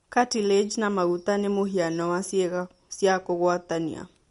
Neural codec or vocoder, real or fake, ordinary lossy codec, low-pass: none; real; MP3, 48 kbps; 19.8 kHz